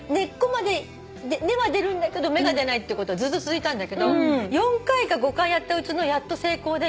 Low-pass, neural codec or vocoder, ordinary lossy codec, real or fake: none; none; none; real